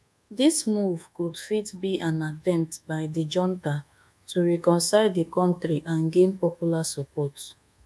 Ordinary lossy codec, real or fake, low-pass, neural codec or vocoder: none; fake; none; codec, 24 kHz, 1.2 kbps, DualCodec